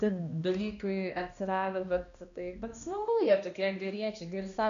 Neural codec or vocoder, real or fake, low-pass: codec, 16 kHz, 1 kbps, X-Codec, HuBERT features, trained on balanced general audio; fake; 7.2 kHz